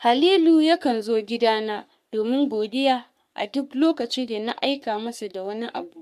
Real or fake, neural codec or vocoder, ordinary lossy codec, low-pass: fake; codec, 44.1 kHz, 3.4 kbps, Pupu-Codec; AAC, 96 kbps; 14.4 kHz